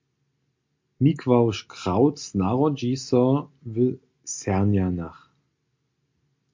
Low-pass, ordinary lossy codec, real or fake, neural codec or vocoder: 7.2 kHz; MP3, 48 kbps; real; none